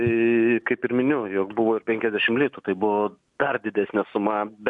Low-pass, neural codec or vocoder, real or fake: 10.8 kHz; none; real